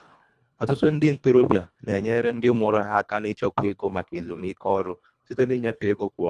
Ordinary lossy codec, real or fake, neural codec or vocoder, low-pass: none; fake; codec, 24 kHz, 1.5 kbps, HILCodec; none